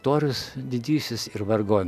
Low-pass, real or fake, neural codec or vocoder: 14.4 kHz; real; none